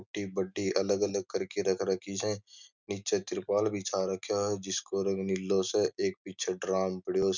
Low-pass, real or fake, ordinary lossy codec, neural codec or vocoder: 7.2 kHz; real; none; none